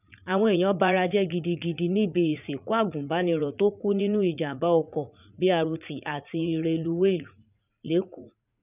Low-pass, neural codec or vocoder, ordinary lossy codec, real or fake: 3.6 kHz; vocoder, 44.1 kHz, 80 mel bands, Vocos; none; fake